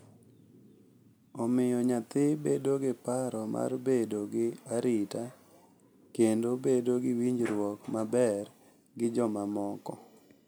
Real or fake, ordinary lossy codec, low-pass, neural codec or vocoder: real; none; none; none